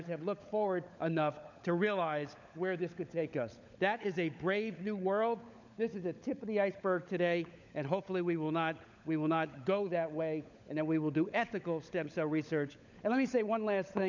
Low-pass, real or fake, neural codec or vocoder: 7.2 kHz; fake; codec, 16 kHz, 16 kbps, FunCodec, trained on LibriTTS, 50 frames a second